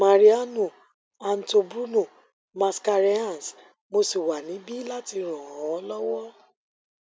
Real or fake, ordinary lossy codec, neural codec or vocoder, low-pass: real; none; none; none